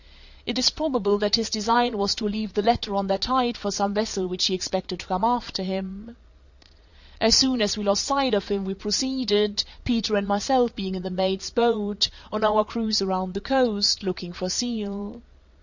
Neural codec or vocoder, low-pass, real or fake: vocoder, 22.05 kHz, 80 mel bands, Vocos; 7.2 kHz; fake